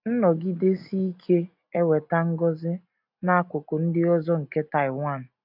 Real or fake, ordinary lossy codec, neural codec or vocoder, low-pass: real; AAC, 48 kbps; none; 5.4 kHz